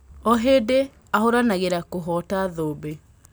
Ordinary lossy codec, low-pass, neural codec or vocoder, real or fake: none; none; none; real